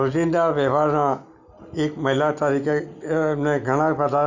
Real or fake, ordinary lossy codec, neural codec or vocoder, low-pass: real; none; none; 7.2 kHz